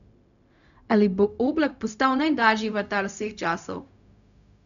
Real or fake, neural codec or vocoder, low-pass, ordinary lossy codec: fake; codec, 16 kHz, 0.4 kbps, LongCat-Audio-Codec; 7.2 kHz; none